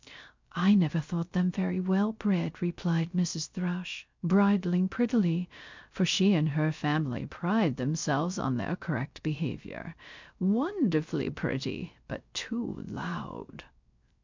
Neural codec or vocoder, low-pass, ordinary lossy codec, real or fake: codec, 16 kHz, 0.3 kbps, FocalCodec; 7.2 kHz; MP3, 64 kbps; fake